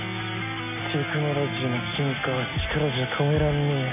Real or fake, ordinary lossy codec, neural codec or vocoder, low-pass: real; none; none; 3.6 kHz